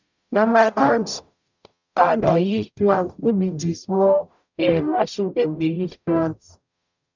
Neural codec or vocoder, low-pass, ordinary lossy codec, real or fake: codec, 44.1 kHz, 0.9 kbps, DAC; 7.2 kHz; none; fake